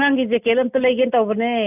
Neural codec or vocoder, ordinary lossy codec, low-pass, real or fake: none; none; 3.6 kHz; real